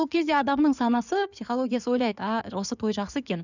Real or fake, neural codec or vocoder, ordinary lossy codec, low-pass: fake; codec, 16 kHz in and 24 kHz out, 2.2 kbps, FireRedTTS-2 codec; none; 7.2 kHz